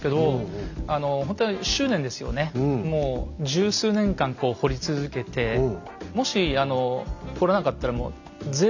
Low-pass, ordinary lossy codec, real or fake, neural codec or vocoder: 7.2 kHz; none; real; none